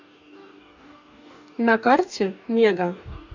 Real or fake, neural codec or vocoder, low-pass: fake; codec, 44.1 kHz, 2.6 kbps, DAC; 7.2 kHz